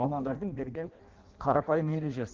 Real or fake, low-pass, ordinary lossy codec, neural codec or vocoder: fake; 7.2 kHz; Opus, 16 kbps; codec, 16 kHz in and 24 kHz out, 0.6 kbps, FireRedTTS-2 codec